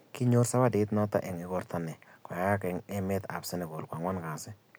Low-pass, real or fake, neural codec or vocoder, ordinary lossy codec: none; real; none; none